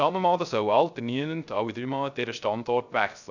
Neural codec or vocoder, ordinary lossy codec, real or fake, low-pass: codec, 16 kHz, 0.3 kbps, FocalCodec; none; fake; 7.2 kHz